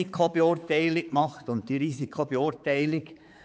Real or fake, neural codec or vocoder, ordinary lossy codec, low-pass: fake; codec, 16 kHz, 4 kbps, X-Codec, HuBERT features, trained on balanced general audio; none; none